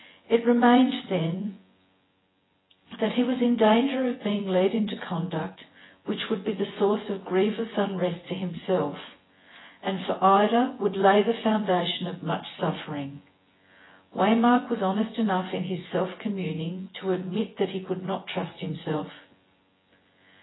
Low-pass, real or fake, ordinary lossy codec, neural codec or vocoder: 7.2 kHz; fake; AAC, 16 kbps; vocoder, 24 kHz, 100 mel bands, Vocos